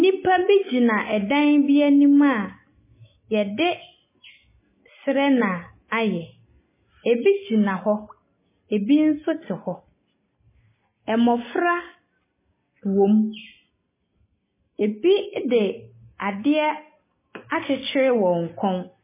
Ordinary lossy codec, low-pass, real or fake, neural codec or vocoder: MP3, 16 kbps; 3.6 kHz; real; none